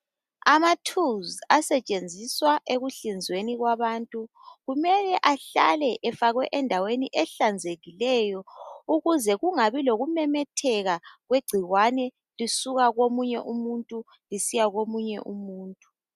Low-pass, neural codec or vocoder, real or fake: 14.4 kHz; none; real